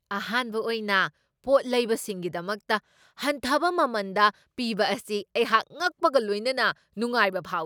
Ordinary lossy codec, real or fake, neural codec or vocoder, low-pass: none; real; none; none